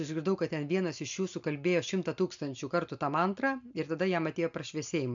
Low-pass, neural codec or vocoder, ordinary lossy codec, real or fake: 7.2 kHz; none; MP3, 64 kbps; real